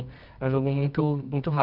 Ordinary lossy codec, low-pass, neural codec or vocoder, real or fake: none; 5.4 kHz; codec, 24 kHz, 0.9 kbps, WavTokenizer, medium music audio release; fake